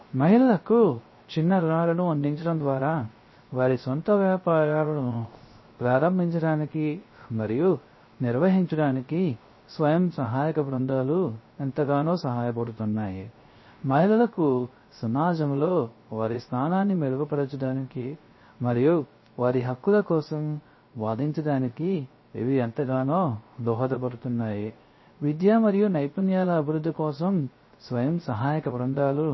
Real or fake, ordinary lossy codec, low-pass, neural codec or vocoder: fake; MP3, 24 kbps; 7.2 kHz; codec, 16 kHz, 0.3 kbps, FocalCodec